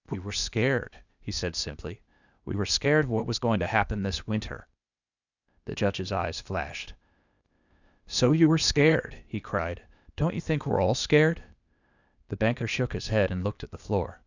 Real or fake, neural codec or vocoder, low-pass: fake; codec, 16 kHz, 0.8 kbps, ZipCodec; 7.2 kHz